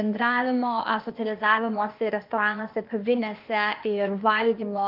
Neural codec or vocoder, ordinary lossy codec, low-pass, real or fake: codec, 16 kHz, 0.8 kbps, ZipCodec; Opus, 32 kbps; 5.4 kHz; fake